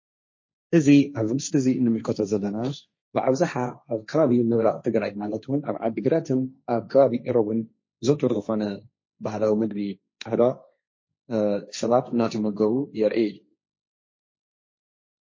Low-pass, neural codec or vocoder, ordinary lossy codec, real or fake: 7.2 kHz; codec, 16 kHz, 1.1 kbps, Voila-Tokenizer; MP3, 32 kbps; fake